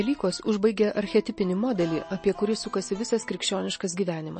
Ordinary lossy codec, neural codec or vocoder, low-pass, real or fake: MP3, 32 kbps; none; 9.9 kHz; real